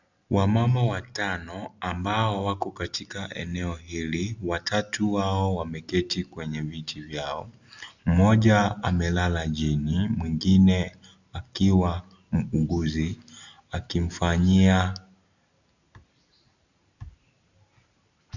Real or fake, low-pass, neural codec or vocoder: real; 7.2 kHz; none